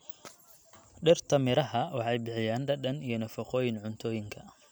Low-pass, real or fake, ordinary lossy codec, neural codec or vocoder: none; real; none; none